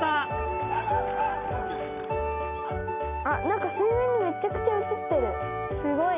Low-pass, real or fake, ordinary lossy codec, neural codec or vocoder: 3.6 kHz; real; none; none